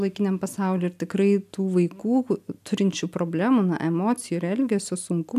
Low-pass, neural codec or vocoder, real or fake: 14.4 kHz; none; real